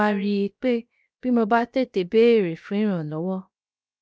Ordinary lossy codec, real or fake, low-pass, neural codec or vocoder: none; fake; none; codec, 16 kHz, 0.7 kbps, FocalCodec